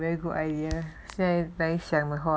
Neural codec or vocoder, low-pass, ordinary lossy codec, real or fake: none; none; none; real